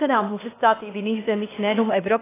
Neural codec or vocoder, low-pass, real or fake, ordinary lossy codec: codec, 16 kHz, 0.8 kbps, ZipCodec; 3.6 kHz; fake; AAC, 16 kbps